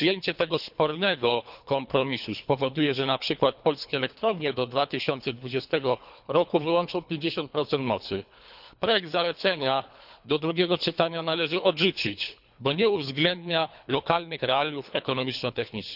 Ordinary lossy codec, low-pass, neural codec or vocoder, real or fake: none; 5.4 kHz; codec, 24 kHz, 3 kbps, HILCodec; fake